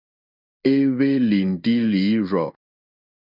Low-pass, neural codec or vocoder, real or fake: 5.4 kHz; codec, 16 kHz in and 24 kHz out, 1 kbps, XY-Tokenizer; fake